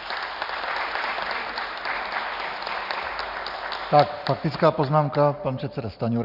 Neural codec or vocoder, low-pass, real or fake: none; 5.4 kHz; real